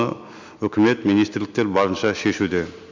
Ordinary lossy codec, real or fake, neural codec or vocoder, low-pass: MP3, 64 kbps; real; none; 7.2 kHz